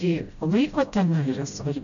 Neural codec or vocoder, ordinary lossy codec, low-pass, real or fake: codec, 16 kHz, 0.5 kbps, FreqCodec, smaller model; MP3, 96 kbps; 7.2 kHz; fake